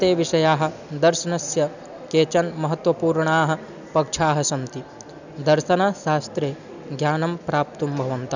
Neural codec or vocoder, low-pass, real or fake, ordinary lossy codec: none; 7.2 kHz; real; none